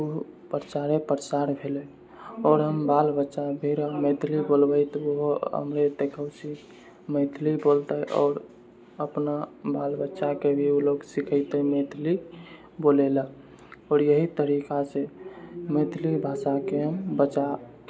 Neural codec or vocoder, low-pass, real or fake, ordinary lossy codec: none; none; real; none